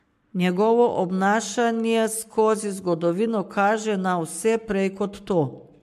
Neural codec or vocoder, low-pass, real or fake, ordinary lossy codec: codec, 44.1 kHz, 7.8 kbps, Pupu-Codec; 14.4 kHz; fake; MP3, 64 kbps